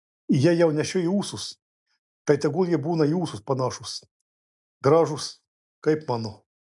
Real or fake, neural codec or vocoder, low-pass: real; none; 10.8 kHz